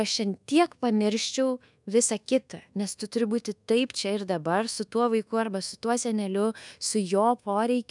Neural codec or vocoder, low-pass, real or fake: codec, 24 kHz, 1.2 kbps, DualCodec; 10.8 kHz; fake